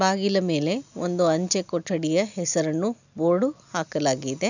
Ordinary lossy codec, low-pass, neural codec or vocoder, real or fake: none; 7.2 kHz; none; real